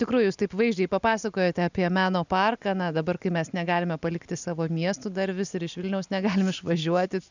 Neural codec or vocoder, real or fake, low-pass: none; real; 7.2 kHz